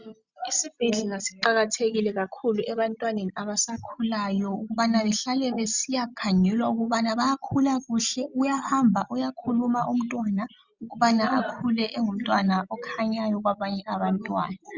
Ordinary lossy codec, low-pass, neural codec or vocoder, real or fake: Opus, 64 kbps; 7.2 kHz; none; real